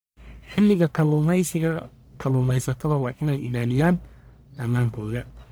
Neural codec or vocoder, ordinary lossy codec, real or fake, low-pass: codec, 44.1 kHz, 1.7 kbps, Pupu-Codec; none; fake; none